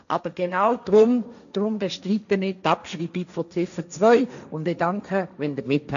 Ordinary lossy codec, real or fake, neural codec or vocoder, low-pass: none; fake; codec, 16 kHz, 1.1 kbps, Voila-Tokenizer; 7.2 kHz